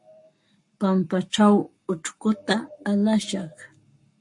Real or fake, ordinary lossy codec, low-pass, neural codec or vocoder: fake; MP3, 48 kbps; 10.8 kHz; codec, 44.1 kHz, 7.8 kbps, Pupu-Codec